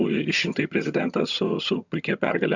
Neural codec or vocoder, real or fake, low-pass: vocoder, 22.05 kHz, 80 mel bands, HiFi-GAN; fake; 7.2 kHz